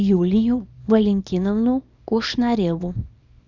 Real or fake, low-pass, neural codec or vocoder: fake; 7.2 kHz; codec, 24 kHz, 0.9 kbps, WavTokenizer, small release